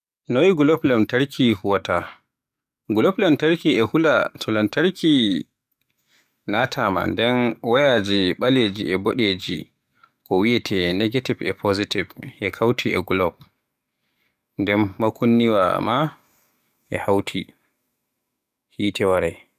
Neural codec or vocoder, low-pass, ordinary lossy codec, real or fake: codec, 44.1 kHz, 7.8 kbps, DAC; 14.4 kHz; none; fake